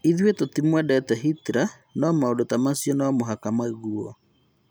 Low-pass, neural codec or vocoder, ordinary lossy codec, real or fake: none; none; none; real